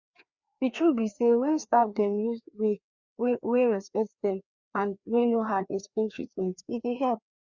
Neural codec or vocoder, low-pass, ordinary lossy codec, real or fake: codec, 16 kHz, 2 kbps, FreqCodec, larger model; 7.2 kHz; Opus, 64 kbps; fake